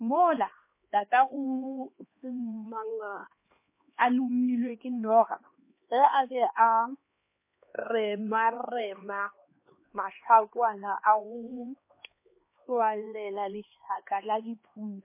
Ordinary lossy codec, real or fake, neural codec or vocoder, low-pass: MP3, 24 kbps; fake; codec, 16 kHz, 2 kbps, X-Codec, HuBERT features, trained on LibriSpeech; 3.6 kHz